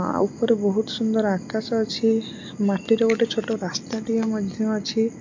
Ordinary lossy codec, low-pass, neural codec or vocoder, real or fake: MP3, 64 kbps; 7.2 kHz; none; real